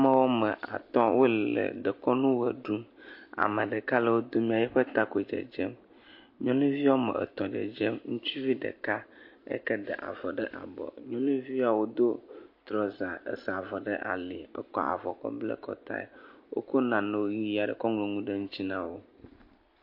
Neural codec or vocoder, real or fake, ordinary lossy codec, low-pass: none; real; MP3, 32 kbps; 5.4 kHz